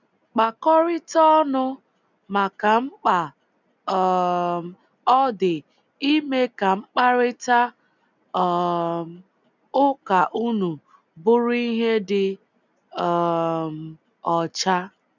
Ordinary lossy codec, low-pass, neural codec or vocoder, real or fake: none; 7.2 kHz; none; real